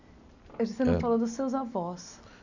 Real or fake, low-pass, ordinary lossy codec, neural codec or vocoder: real; 7.2 kHz; none; none